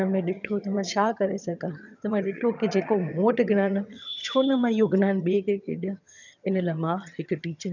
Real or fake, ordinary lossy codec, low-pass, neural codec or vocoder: fake; none; 7.2 kHz; vocoder, 22.05 kHz, 80 mel bands, HiFi-GAN